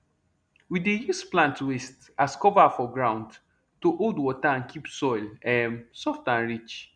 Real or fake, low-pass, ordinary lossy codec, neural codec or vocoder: real; 9.9 kHz; none; none